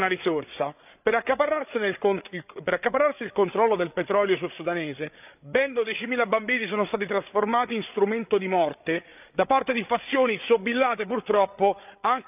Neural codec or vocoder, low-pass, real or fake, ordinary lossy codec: codec, 16 kHz, 8 kbps, FreqCodec, larger model; 3.6 kHz; fake; none